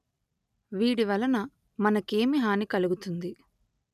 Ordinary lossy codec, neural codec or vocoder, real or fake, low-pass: none; none; real; 14.4 kHz